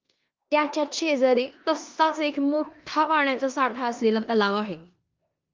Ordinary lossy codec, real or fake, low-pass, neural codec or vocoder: Opus, 24 kbps; fake; 7.2 kHz; codec, 16 kHz in and 24 kHz out, 0.9 kbps, LongCat-Audio-Codec, four codebook decoder